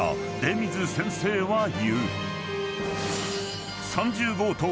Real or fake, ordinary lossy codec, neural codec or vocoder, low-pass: real; none; none; none